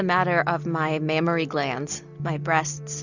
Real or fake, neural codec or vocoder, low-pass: real; none; 7.2 kHz